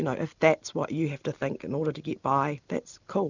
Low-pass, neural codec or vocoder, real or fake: 7.2 kHz; none; real